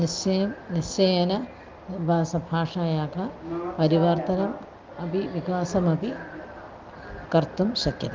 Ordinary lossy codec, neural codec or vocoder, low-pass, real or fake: Opus, 24 kbps; none; 7.2 kHz; real